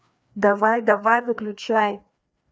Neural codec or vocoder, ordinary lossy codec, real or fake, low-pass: codec, 16 kHz, 2 kbps, FreqCodec, larger model; none; fake; none